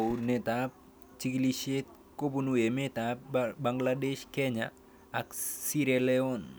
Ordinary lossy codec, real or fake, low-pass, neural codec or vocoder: none; real; none; none